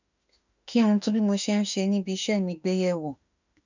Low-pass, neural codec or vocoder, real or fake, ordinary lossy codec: 7.2 kHz; autoencoder, 48 kHz, 32 numbers a frame, DAC-VAE, trained on Japanese speech; fake; none